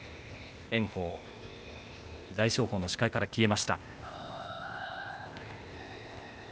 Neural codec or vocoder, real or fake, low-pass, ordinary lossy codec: codec, 16 kHz, 0.8 kbps, ZipCodec; fake; none; none